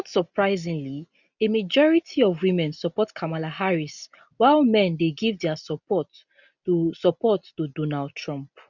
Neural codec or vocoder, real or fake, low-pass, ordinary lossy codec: none; real; 7.2 kHz; none